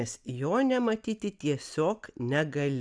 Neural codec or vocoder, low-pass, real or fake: none; 9.9 kHz; real